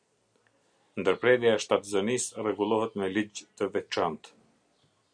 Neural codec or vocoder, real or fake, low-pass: none; real; 9.9 kHz